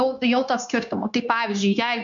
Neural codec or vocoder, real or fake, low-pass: codec, 16 kHz, 0.9 kbps, LongCat-Audio-Codec; fake; 7.2 kHz